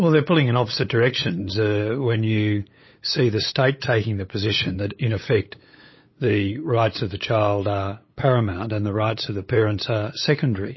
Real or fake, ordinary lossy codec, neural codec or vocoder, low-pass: fake; MP3, 24 kbps; codec, 16 kHz, 8 kbps, FunCodec, trained on LibriTTS, 25 frames a second; 7.2 kHz